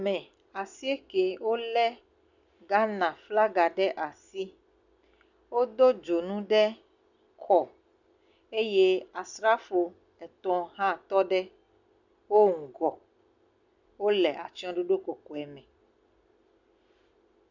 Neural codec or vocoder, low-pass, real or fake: none; 7.2 kHz; real